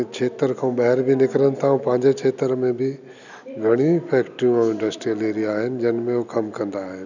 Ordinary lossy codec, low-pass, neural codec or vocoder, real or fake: none; 7.2 kHz; none; real